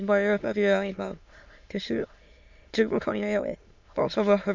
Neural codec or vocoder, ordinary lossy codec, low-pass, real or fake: autoencoder, 22.05 kHz, a latent of 192 numbers a frame, VITS, trained on many speakers; MP3, 48 kbps; 7.2 kHz; fake